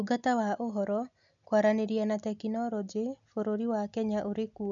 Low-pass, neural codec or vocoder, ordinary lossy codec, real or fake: 7.2 kHz; none; none; real